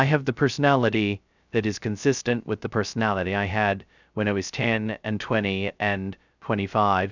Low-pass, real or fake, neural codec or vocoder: 7.2 kHz; fake; codec, 16 kHz, 0.2 kbps, FocalCodec